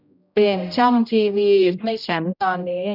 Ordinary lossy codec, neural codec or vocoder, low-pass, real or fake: none; codec, 16 kHz, 0.5 kbps, X-Codec, HuBERT features, trained on general audio; 5.4 kHz; fake